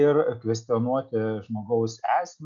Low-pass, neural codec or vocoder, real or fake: 7.2 kHz; none; real